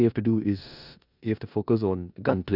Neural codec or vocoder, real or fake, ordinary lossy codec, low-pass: codec, 16 kHz in and 24 kHz out, 0.9 kbps, LongCat-Audio-Codec, four codebook decoder; fake; none; 5.4 kHz